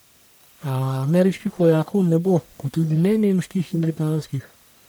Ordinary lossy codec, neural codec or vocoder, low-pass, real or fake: none; codec, 44.1 kHz, 1.7 kbps, Pupu-Codec; none; fake